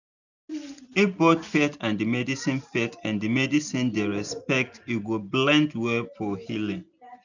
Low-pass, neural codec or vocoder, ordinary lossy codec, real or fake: 7.2 kHz; none; none; real